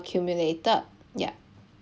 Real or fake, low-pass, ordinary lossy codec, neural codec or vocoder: real; none; none; none